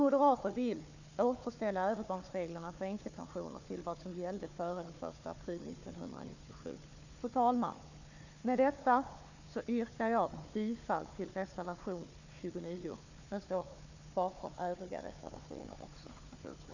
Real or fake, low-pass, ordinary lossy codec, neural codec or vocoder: fake; 7.2 kHz; none; codec, 16 kHz, 4 kbps, FunCodec, trained on Chinese and English, 50 frames a second